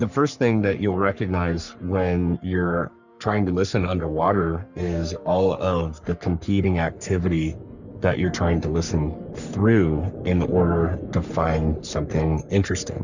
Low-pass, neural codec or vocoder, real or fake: 7.2 kHz; codec, 44.1 kHz, 3.4 kbps, Pupu-Codec; fake